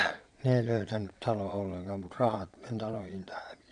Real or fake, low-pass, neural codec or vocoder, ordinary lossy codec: fake; 9.9 kHz; vocoder, 22.05 kHz, 80 mel bands, Vocos; none